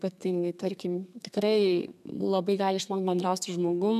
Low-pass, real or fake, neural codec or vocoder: 14.4 kHz; fake; codec, 32 kHz, 1.9 kbps, SNAC